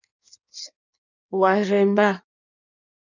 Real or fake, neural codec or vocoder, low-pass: fake; codec, 16 kHz in and 24 kHz out, 1.1 kbps, FireRedTTS-2 codec; 7.2 kHz